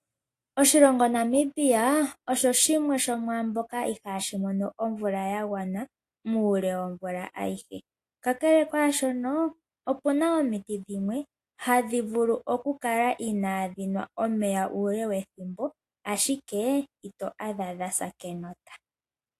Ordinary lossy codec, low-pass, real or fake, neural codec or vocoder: AAC, 64 kbps; 14.4 kHz; real; none